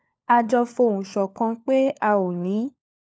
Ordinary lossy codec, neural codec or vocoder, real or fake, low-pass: none; codec, 16 kHz, 4 kbps, FunCodec, trained on LibriTTS, 50 frames a second; fake; none